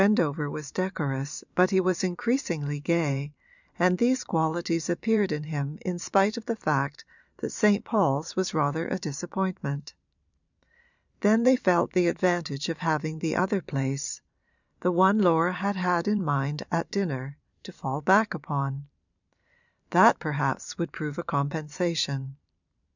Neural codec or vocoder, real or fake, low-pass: vocoder, 44.1 kHz, 128 mel bands every 512 samples, BigVGAN v2; fake; 7.2 kHz